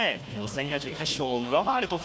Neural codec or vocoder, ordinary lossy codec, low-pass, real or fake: codec, 16 kHz, 1 kbps, FunCodec, trained on Chinese and English, 50 frames a second; none; none; fake